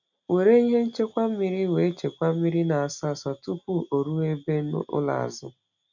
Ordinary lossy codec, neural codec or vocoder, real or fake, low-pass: none; none; real; 7.2 kHz